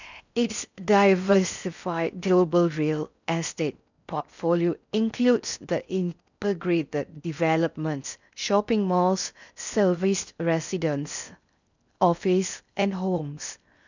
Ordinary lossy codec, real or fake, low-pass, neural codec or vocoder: none; fake; 7.2 kHz; codec, 16 kHz in and 24 kHz out, 0.6 kbps, FocalCodec, streaming, 4096 codes